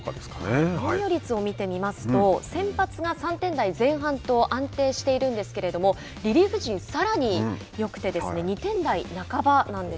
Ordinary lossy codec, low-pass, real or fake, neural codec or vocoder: none; none; real; none